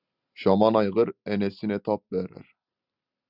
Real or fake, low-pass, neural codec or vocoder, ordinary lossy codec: real; 5.4 kHz; none; Opus, 64 kbps